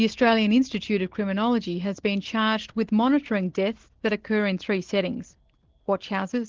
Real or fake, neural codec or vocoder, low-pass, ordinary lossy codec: real; none; 7.2 kHz; Opus, 16 kbps